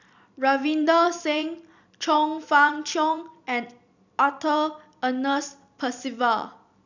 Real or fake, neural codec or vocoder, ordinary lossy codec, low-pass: fake; vocoder, 44.1 kHz, 128 mel bands every 256 samples, BigVGAN v2; none; 7.2 kHz